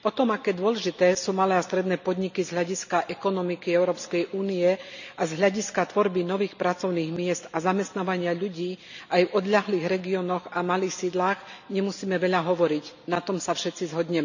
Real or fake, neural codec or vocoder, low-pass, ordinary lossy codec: real; none; 7.2 kHz; none